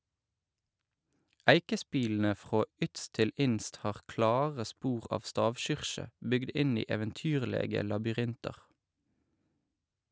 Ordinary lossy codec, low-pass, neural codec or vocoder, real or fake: none; none; none; real